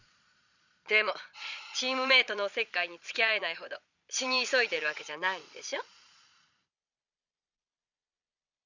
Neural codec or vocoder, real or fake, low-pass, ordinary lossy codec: vocoder, 22.05 kHz, 80 mel bands, Vocos; fake; 7.2 kHz; none